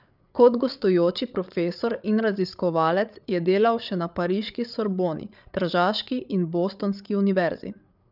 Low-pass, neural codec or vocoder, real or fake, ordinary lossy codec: 5.4 kHz; codec, 16 kHz, 8 kbps, FreqCodec, larger model; fake; none